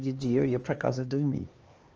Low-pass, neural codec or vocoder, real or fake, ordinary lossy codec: 7.2 kHz; codec, 16 kHz, 2 kbps, X-Codec, HuBERT features, trained on LibriSpeech; fake; Opus, 24 kbps